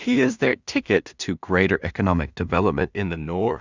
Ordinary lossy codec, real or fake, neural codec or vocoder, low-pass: Opus, 64 kbps; fake; codec, 16 kHz in and 24 kHz out, 0.4 kbps, LongCat-Audio-Codec, two codebook decoder; 7.2 kHz